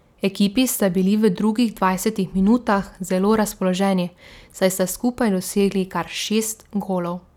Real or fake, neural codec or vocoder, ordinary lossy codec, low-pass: real; none; none; 19.8 kHz